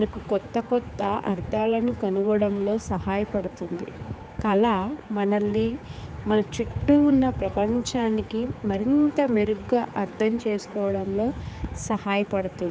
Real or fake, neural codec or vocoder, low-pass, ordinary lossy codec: fake; codec, 16 kHz, 4 kbps, X-Codec, HuBERT features, trained on general audio; none; none